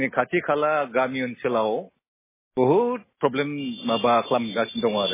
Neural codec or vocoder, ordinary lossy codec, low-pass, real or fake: none; MP3, 16 kbps; 3.6 kHz; real